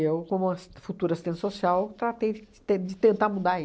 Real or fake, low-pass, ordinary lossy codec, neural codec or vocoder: real; none; none; none